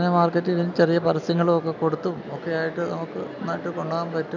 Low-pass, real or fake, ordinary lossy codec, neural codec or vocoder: 7.2 kHz; real; none; none